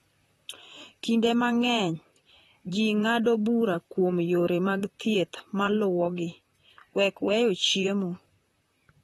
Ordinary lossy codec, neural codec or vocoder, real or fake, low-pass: AAC, 32 kbps; vocoder, 44.1 kHz, 128 mel bands, Pupu-Vocoder; fake; 19.8 kHz